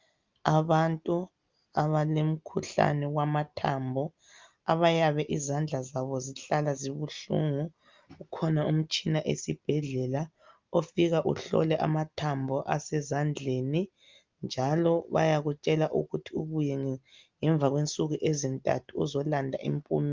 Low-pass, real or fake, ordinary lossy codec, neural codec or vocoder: 7.2 kHz; real; Opus, 24 kbps; none